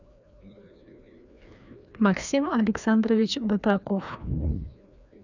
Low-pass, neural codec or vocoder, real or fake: 7.2 kHz; codec, 16 kHz, 2 kbps, FreqCodec, larger model; fake